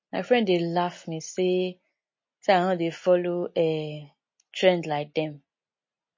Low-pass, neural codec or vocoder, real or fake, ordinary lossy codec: 7.2 kHz; none; real; MP3, 32 kbps